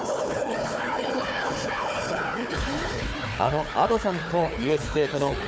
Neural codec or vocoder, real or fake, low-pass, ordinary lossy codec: codec, 16 kHz, 4 kbps, FunCodec, trained on Chinese and English, 50 frames a second; fake; none; none